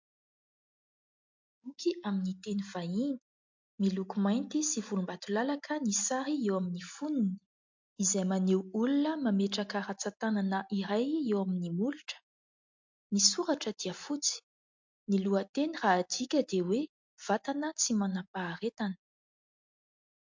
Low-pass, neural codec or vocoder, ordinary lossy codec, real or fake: 7.2 kHz; none; MP3, 48 kbps; real